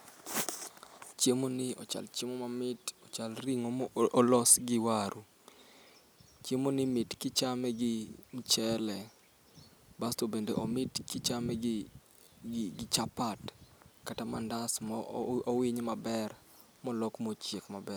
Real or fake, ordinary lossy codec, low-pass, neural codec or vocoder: real; none; none; none